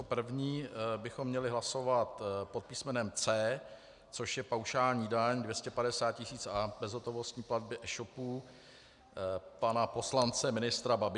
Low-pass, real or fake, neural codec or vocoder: 10.8 kHz; real; none